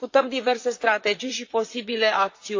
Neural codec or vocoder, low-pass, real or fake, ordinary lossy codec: vocoder, 44.1 kHz, 128 mel bands, Pupu-Vocoder; 7.2 kHz; fake; AAC, 48 kbps